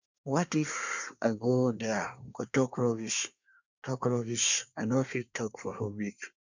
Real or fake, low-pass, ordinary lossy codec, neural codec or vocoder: fake; 7.2 kHz; none; codec, 24 kHz, 1 kbps, SNAC